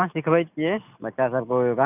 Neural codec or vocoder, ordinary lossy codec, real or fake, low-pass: none; none; real; 3.6 kHz